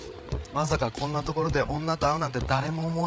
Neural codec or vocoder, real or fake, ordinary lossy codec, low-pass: codec, 16 kHz, 8 kbps, FreqCodec, larger model; fake; none; none